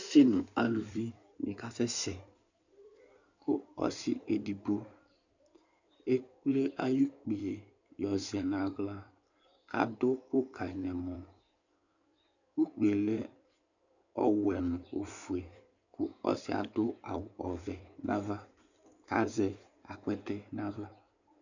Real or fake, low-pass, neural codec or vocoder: fake; 7.2 kHz; codec, 16 kHz in and 24 kHz out, 2.2 kbps, FireRedTTS-2 codec